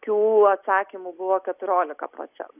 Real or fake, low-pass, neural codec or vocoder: real; 3.6 kHz; none